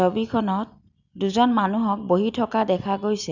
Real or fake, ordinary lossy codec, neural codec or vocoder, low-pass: real; none; none; 7.2 kHz